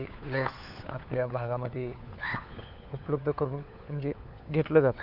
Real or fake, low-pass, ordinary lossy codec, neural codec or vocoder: fake; 5.4 kHz; none; codec, 16 kHz, 4 kbps, FunCodec, trained on LibriTTS, 50 frames a second